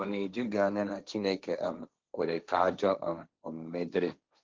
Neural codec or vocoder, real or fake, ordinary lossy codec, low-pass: codec, 16 kHz, 1.1 kbps, Voila-Tokenizer; fake; Opus, 32 kbps; 7.2 kHz